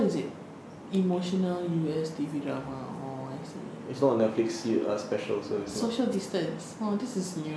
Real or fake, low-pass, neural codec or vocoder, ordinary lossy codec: real; none; none; none